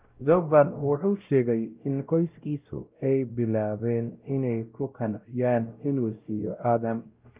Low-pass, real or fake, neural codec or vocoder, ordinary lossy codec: 3.6 kHz; fake; codec, 16 kHz, 0.5 kbps, X-Codec, WavLM features, trained on Multilingual LibriSpeech; Opus, 24 kbps